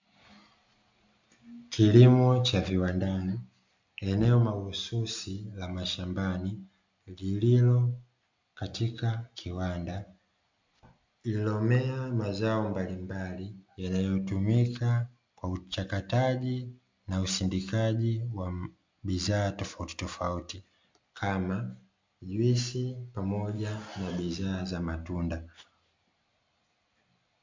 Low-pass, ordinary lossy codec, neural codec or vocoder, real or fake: 7.2 kHz; MP3, 64 kbps; none; real